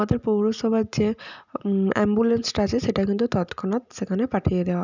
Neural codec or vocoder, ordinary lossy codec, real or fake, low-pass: none; none; real; 7.2 kHz